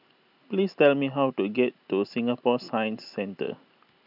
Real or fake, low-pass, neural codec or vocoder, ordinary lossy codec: real; 5.4 kHz; none; none